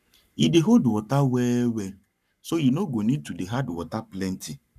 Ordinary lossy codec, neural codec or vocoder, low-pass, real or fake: none; codec, 44.1 kHz, 7.8 kbps, Pupu-Codec; 14.4 kHz; fake